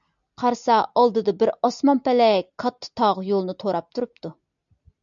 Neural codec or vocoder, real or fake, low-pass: none; real; 7.2 kHz